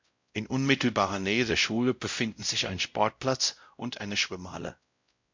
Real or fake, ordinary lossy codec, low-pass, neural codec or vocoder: fake; MP3, 64 kbps; 7.2 kHz; codec, 16 kHz, 0.5 kbps, X-Codec, WavLM features, trained on Multilingual LibriSpeech